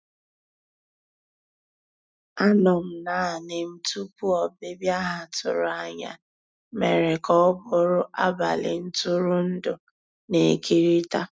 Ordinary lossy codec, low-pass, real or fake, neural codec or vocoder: none; none; real; none